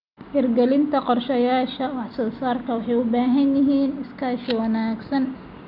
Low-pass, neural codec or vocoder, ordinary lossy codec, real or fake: 5.4 kHz; none; none; real